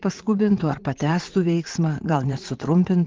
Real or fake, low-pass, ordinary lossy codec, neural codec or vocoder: fake; 7.2 kHz; Opus, 16 kbps; vocoder, 44.1 kHz, 80 mel bands, Vocos